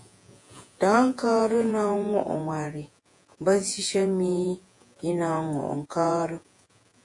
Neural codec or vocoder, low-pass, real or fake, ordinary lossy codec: vocoder, 48 kHz, 128 mel bands, Vocos; 10.8 kHz; fake; AAC, 64 kbps